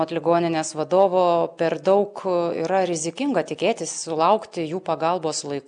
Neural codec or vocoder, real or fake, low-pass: none; real; 9.9 kHz